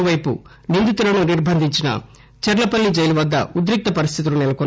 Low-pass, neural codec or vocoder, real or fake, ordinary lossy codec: none; none; real; none